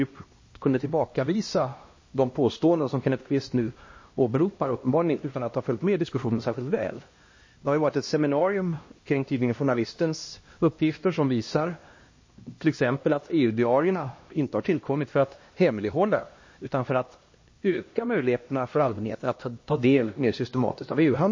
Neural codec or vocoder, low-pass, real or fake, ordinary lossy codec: codec, 16 kHz, 1 kbps, X-Codec, HuBERT features, trained on LibriSpeech; 7.2 kHz; fake; MP3, 32 kbps